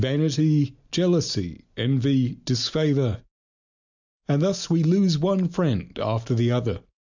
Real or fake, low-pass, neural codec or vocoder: real; 7.2 kHz; none